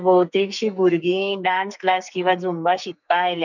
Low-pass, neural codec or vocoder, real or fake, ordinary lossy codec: 7.2 kHz; codec, 44.1 kHz, 2.6 kbps, SNAC; fake; none